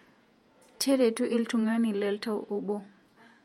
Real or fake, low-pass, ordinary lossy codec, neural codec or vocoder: fake; 19.8 kHz; MP3, 64 kbps; vocoder, 48 kHz, 128 mel bands, Vocos